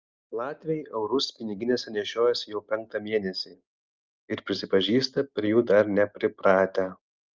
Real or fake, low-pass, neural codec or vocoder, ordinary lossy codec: real; 7.2 kHz; none; Opus, 24 kbps